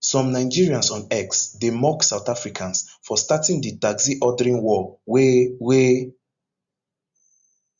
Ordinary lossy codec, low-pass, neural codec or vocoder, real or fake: Opus, 64 kbps; 7.2 kHz; none; real